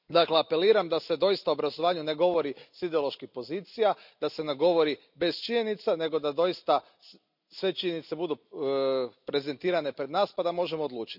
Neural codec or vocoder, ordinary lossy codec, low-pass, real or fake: none; none; 5.4 kHz; real